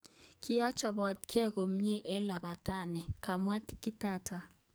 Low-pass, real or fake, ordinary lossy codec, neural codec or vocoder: none; fake; none; codec, 44.1 kHz, 2.6 kbps, SNAC